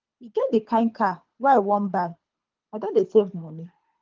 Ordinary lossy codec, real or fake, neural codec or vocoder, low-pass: Opus, 32 kbps; fake; codec, 24 kHz, 3 kbps, HILCodec; 7.2 kHz